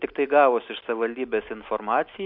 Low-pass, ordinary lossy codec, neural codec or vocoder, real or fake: 5.4 kHz; MP3, 48 kbps; none; real